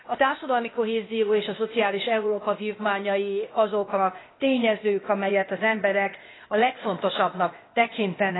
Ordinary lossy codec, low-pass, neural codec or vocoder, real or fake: AAC, 16 kbps; 7.2 kHz; codec, 16 kHz, 0.8 kbps, ZipCodec; fake